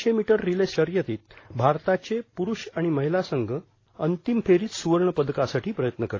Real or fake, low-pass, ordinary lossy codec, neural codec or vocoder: real; 7.2 kHz; AAC, 32 kbps; none